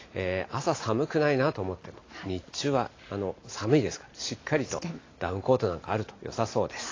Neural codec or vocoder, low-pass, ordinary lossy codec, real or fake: none; 7.2 kHz; AAC, 32 kbps; real